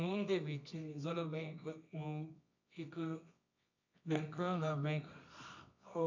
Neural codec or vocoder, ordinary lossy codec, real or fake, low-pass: codec, 24 kHz, 0.9 kbps, WavTokenizer, medium music audio release; none; fake; 7.2 kHz